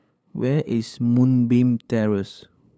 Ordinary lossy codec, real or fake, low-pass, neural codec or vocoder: none; fake; none; codec, 16 kHz, 8 kbps, FunCodec, trained on LibriTTS, 25 frames a second